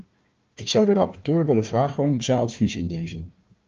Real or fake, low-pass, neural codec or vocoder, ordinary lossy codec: fake; 7.2 kHz; codec, 16 kHz, 1 kbps, FunCodec, trained on Chinese and English, 50 frames a second; Opus, 24 kbps